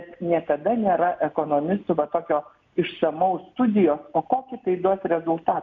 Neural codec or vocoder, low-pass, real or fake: none; 7.2 kHz; real